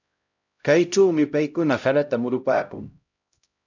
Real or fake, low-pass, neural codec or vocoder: fake; 7.2 kHz; codec, 16 kHz, 0.5 kbps, X-Codec, HuBERT features, trained on LibriSpeech